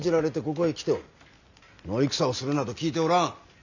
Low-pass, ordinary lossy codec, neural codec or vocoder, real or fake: 7.2 kHz; none; none; real